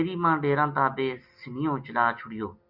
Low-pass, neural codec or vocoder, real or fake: 5.4 kHz; none; real